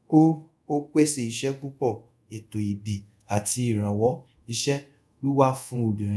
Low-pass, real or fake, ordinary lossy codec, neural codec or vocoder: none; fake; none; codec, 24 kHz, 0.5 kbps, DualCodec